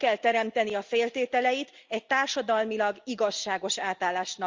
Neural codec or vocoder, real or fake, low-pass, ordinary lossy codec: none; real; 7.2 kHz; Opus, 32 kbps